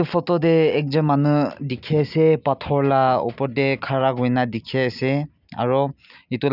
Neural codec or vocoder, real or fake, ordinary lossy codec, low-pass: none; real; none; 5.4 kHz